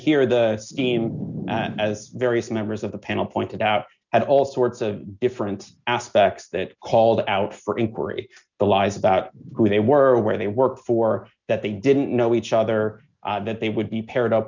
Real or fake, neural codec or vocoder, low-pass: real; none; 7.2 kHz